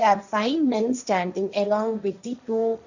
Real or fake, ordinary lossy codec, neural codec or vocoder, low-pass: fake; none; codec, 16 kHz, 1.1 kbps, Voila-Tokenizer; 7.2 kHz